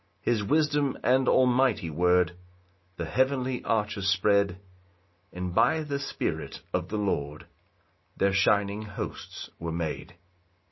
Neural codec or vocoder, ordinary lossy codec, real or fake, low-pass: none; MP3, 24 kbps; real; 7.2 kHz